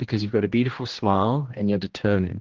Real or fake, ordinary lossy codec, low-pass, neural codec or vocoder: fake; Opus, 16 kbps; 7.2 kHz; codec, 16 kHz, 1 kbps, X-Codec, HuBERT features, trained on general audio